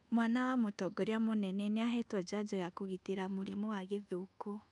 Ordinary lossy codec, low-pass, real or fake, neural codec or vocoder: none; 10.8 kHz; fake; codec, 24 kHz, 1.2 kbps, DualCodec